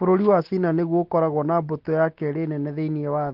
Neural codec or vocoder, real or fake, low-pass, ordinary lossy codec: none; real; 5.4 kHz; Opus, 16 kbps